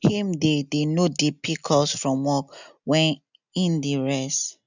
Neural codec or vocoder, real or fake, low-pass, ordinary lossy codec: none; real; 7.2 kHz; none